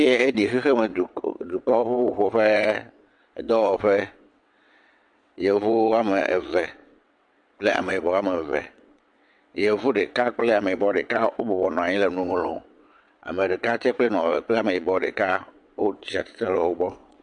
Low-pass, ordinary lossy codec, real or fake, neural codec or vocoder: 9.9 kHz; MP3, 64 kbps; fake; vocoder, 22.05 kHz, 80 mel bands, WaveNeXt